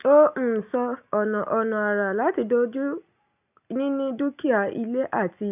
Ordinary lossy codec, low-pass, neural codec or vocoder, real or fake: none; 3.6 kHz; none; real